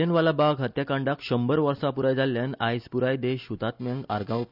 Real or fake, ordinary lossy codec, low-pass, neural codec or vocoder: real; none; 5.4 kHz; none